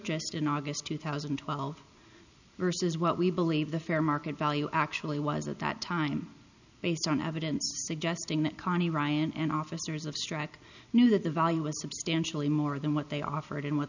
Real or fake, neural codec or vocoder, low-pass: real; none; 7.2 kHz